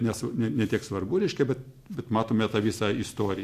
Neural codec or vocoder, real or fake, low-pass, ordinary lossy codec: none; real; 14.4 kHz; MP3, 64 kbps